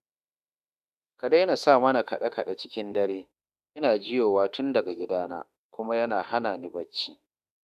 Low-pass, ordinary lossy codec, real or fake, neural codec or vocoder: 14.4 kHz; none; fake; autoencoder, 48 kHz, 32 numbers a frame, DAC-VAE, trained on Japanese speech